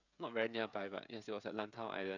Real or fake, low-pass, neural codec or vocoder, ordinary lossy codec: fake; 7.2 kHz; codec, 16 kHz, 16 kbps, FreqCodec, smaller model; none